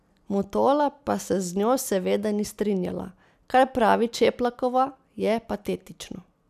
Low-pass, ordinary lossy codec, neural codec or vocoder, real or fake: 14.4 kHz; none; none; real